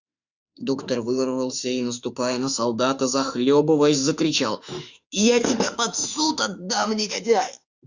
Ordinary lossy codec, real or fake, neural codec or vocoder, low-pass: Opus, 64 kbps; fake; autoencoder, 48 kHz, 32 numbers a frame, DAC-VAE, trained on Japanese speech; 7.2 kHz